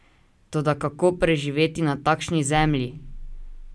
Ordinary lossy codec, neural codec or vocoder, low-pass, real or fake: none; none; none; real